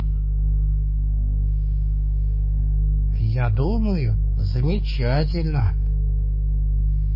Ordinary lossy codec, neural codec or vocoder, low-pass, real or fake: MP3, 24 kbps; codec, 16 kHz, 4 kbps, X-Codec, HuBERT features, trained on balanced general audio; 5.4 kHz; fake